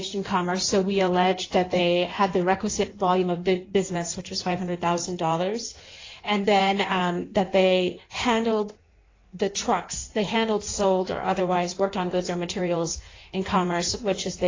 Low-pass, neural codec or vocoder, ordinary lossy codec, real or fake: 7.2 kHz; codec, 16 kHz in and 24 kHz out, 1.1 kbps, FireRedTTS-2 codec; AAC, 32 kbps; fake